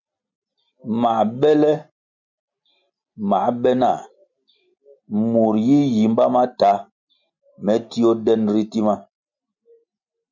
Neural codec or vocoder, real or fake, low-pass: none; real; 7.2 kHz